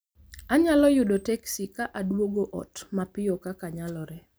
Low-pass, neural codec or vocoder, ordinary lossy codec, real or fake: none; none; none; real